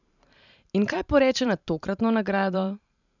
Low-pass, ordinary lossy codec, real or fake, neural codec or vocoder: 7.2 kHz; none; fake; vocoder, 44.1 kHz, 128 mel bands every 512 samples, BigVGAN v2